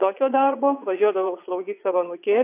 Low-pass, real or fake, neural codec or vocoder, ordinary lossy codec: 3.6 kHz; fake; vocoder, 22.05 kHz, 80 mel bands, Vocos; AAC, 24 kbps